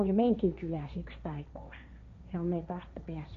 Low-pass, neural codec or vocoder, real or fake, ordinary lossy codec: 7.2 kHz; codec, 16 kHz, 1.1 kbps, Voila-Tokenizer; fake; MP3, 48 kbps